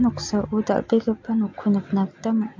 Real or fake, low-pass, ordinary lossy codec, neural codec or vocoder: real; 7.2 kHz; MP3, 48 kbps; none